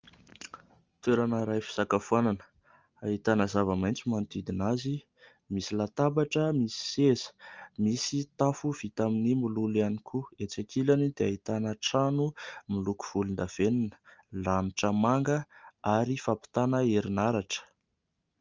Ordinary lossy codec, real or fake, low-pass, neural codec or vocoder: Opus, 24 kbps; real; 7.2 kHz; none